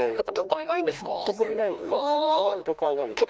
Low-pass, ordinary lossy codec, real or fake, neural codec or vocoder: none; none; fake; codec, 16 kHz, 1 kbps, FreqCodec, larger model